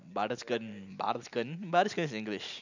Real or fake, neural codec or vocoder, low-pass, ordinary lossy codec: real; none; 7.2 kHz; none